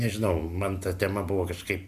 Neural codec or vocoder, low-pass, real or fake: none; 14.4 kHz; real